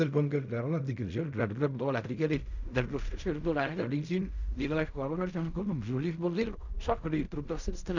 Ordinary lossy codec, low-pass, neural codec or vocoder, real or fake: none; 7.2 kHz; codec, 16 kHz in and 24 kHz out, 0.4 kbps, LongCat-Audio-Codec, fine tuned four codebook decoder; fake